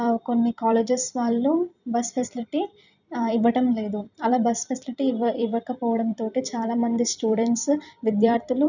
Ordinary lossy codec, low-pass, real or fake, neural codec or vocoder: none; 7.2 kHz; fake; vocoder, 44.1 kHz, 128 mel bands every 256 samples, BigVGAN v2